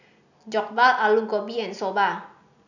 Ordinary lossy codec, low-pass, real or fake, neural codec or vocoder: none; 7.2 kHz; real; none